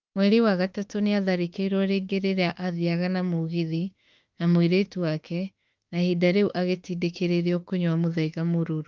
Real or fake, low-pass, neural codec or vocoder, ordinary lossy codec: fake; 7.2 kHz; autoencoder, 48 kHz, 32 numbers a frame, DAC-VAE, trained on Japanese speech; Opus, 24 kbps